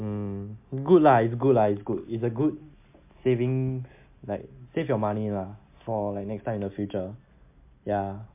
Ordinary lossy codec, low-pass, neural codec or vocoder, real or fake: MP3, 32 kbps; 3.6 kHz; none; real